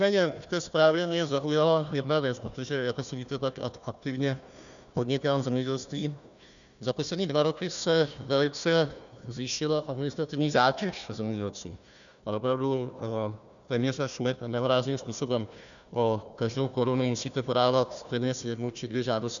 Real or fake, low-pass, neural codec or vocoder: fake; 7.2 kHz; codec, 16 kHz, 1 kbps, FunCodec, trained on Chinese and English, 50 frames a second